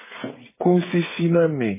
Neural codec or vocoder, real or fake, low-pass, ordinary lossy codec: codec, 16 kHz, 8 kbps, FreqCodec, smaller model; fake; 3.6 kHz; MP3, 16 kbps